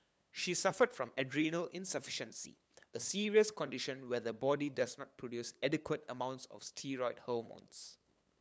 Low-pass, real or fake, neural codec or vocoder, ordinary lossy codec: none; fake; codec, 16 kHz, 8 kbps, FunCodec, trained on LibriTTS, 25 frames a second; none